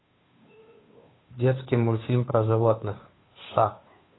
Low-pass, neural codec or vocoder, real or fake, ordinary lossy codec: 7.2 kHz; codec, 16 kHz, 2 kbps, FunCodec, trained on Chinese and English, 25 frames a second; fake; AAC, 16 kbps